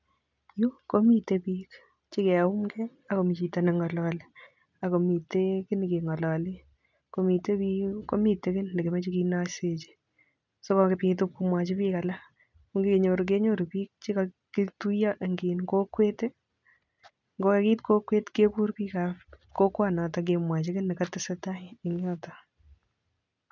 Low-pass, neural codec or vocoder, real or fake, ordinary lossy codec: 7.2 kHz; none; real; none